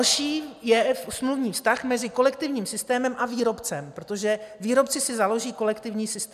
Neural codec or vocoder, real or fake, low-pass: none; real; 14.4 kHz